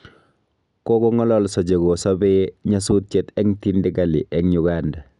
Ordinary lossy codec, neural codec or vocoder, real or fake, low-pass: none; none; real; 10.8 kHz